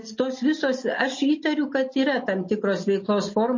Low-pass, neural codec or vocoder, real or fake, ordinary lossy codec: 7.2 kHz; none; real; MP3, 32 kbps